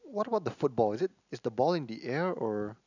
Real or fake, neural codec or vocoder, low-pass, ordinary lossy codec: real; none; 7.2 kHz; none